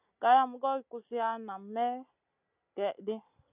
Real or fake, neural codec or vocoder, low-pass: real; none; 3.6 kHz